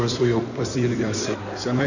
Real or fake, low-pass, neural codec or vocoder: fake; 7.2 kHz; codec, 16 kHz in and 24 kHz out, 2.2 kbps, FireRedTTS-2 codec